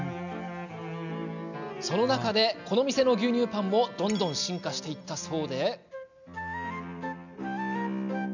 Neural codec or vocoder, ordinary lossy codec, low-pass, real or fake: none; none; 7.2 kHz; real